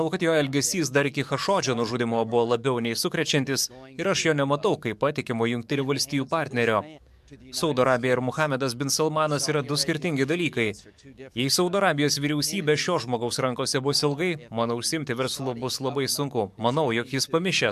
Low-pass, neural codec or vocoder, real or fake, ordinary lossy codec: 14.4 kHz; codec, 44.1 kHz, 7.8 kbps, DAC; fake; MP3, 96 kbps